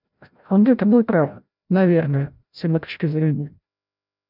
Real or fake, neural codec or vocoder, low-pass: fake; codec, 16 kHz, 0.5 kbps, FreqCodec, larger model; 5.4 kHz